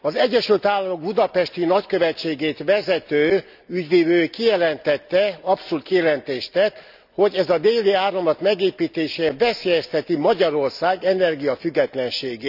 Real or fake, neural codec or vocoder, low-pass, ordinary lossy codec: real; none; 5.4 kHz; none